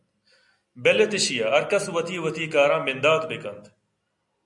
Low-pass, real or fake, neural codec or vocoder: 10.8 kHz; real; none